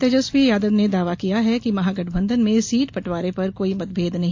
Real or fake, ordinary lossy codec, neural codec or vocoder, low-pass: real; AAC, 48 kbps; none; 7.2 kHz